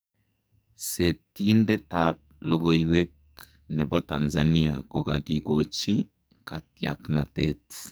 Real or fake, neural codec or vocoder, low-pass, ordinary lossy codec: fake; codec, 44.1 kHz, 2.6 kbps, SNAC; none; none